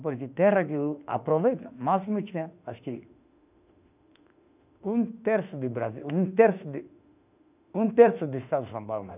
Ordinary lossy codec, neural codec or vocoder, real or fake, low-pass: none; autoencoder, 48 kHz, 32 numbers a frame, DAC-VAE, trained on Japanese speech; fake; 3.6 kHz